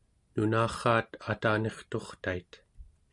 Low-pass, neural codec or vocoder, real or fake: 10.8 kHz; none; real